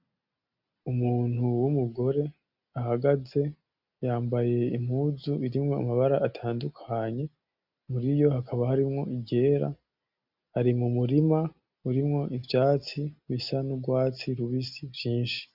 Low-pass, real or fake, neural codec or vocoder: 5.4 kHz; real; none